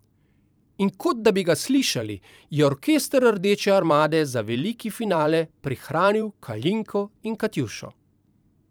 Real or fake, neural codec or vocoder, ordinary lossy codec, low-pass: fake; vocoder, 44.1 kHz, 128 mel bands every 512 samples, BigVGAN v2; none; none